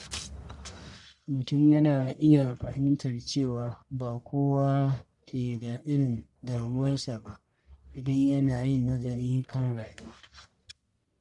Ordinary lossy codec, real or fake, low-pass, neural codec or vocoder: none; fake; 10.8 kHz; codec, 44.1 kHz, 1.7 kbps, Pupu-Codec